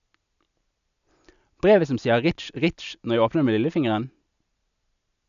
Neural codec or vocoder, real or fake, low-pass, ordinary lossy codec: none; real; 7.2 kHz; none